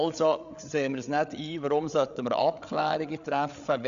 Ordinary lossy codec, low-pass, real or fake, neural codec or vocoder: none; 7.2 kHz; fake; codec, 16 kHz, 8 kbps, FreqCodec, larger model